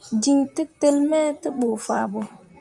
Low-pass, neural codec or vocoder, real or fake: 10.8 kHz; vocoder, 44.1 kHz, 128 mel bands, Pupu-Vocoder; fake